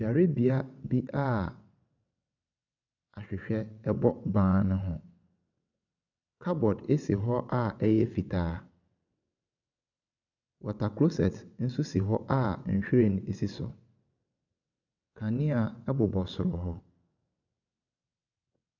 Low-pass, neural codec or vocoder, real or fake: 7.2 kHz; vocoder, 22.05 kHz, 80 mel bands, WaveNeXt; fake